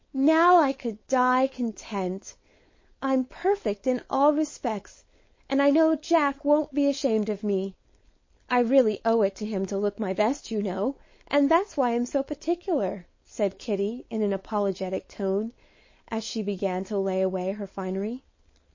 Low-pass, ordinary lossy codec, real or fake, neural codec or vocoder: 7.2 kHz; MP3, 32 kbps; fake; codec, 16 kHz, 4.8 kbps, FACodec